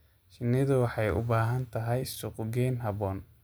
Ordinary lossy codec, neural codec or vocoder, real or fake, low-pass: none; none; real; none